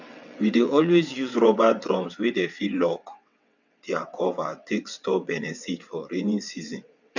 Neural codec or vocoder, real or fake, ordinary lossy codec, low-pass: vocoder, 22.05 kHz, 80 mel bands, WaveNeXt; fake; none; 7.2 kHz